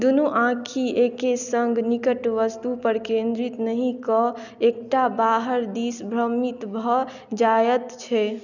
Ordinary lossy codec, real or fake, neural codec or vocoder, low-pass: none; real; none; 7.2 kHz